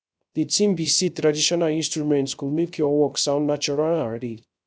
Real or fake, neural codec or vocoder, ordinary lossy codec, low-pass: fake; codec, 16 kHz, 0.3 kbps, FocalCodec; none; none